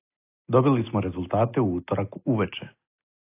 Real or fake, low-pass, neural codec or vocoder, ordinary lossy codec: real; 3.6 kHz; none; AAC, 24 kbps